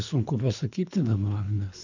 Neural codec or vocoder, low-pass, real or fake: codec, 24 kHz, 3 kbps, HILCodec; 7.2 kHz; fake